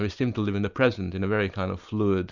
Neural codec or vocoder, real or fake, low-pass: none; real; 7.2 kHz